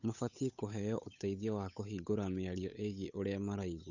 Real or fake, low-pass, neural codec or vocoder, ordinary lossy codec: fake; 7.2 kHz; codec, 16 kHz, 8 kbps, FunCodec, trained on Chinese and English, 25 frames a second; none